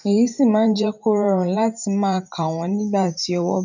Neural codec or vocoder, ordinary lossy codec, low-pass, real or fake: vocoder, 44.1 kHz, 128 mel bands every 512 samples, BigVGAN v2; none; 7.2 kHz; fake